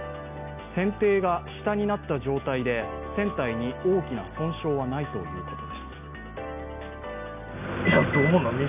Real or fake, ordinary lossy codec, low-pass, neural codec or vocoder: real; none; 3.6 kHz; none